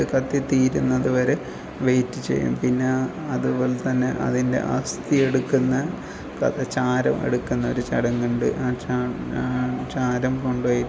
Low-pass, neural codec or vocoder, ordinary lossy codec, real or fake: none; none; none; real